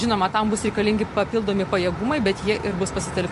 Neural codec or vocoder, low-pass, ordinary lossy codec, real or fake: none; 14.4 kHz; MP3, 48 kbps; real